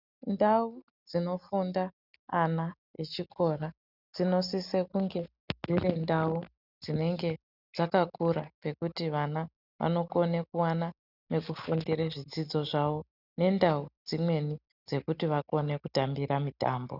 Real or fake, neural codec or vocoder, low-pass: real; none; 5.4 kHz